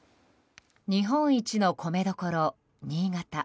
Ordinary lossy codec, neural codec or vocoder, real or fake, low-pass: none; none; real; none